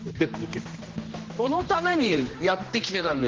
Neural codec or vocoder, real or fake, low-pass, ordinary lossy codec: codec, 16 kHz, 1 kbps, X-Codec, HuBERT features, trained on general audio; fake; 7.2 kHz; Opus, 16 kbps